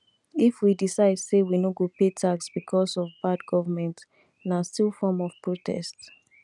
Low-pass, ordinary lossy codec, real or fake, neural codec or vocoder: 10.8 kHz; none; real; none